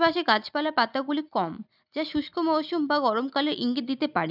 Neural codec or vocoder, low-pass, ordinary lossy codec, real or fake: none; 5.4 kHz; none; real